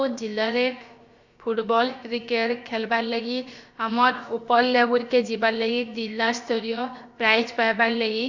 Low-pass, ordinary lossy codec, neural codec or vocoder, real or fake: 7.2 kHz; Opus, 64 kbps; codec, 16 kHz, about 1 kbps, DyCAST, with the encoder's durations; fake